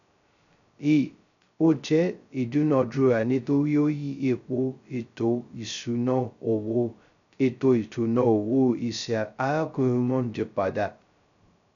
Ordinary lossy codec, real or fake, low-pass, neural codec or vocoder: none; fake; 7.2 kHz; codec, 16 kHz, 0.2 kbps, FocalCodec